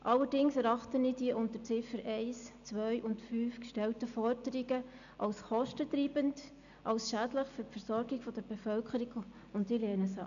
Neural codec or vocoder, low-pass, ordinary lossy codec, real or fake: none; 7.2 kHz; none; real